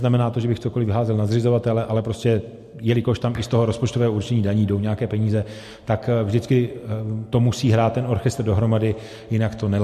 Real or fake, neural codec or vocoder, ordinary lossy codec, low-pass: real; none; MP3, 64 kbps; 14.4 kHz